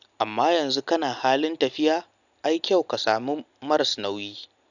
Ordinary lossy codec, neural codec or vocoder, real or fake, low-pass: none; none; real; 7.2 kHz